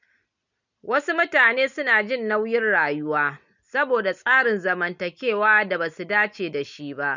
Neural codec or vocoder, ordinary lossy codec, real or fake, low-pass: none; none; real; 7.2 kHz